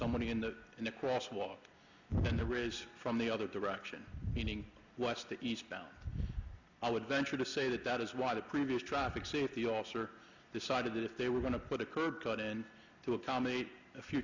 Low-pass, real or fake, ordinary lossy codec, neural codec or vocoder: 7.2 kHz; real; MP3, 64 kbps; none